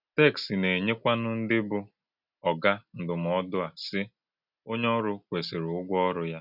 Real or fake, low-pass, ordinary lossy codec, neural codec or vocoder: real; 5.4 kHz; none; none